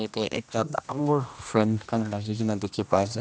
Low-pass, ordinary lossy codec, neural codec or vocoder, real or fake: none; none; codec, 16 kHz, 1 kbps, X-Codec, HuBERT features, trained on general audio; fake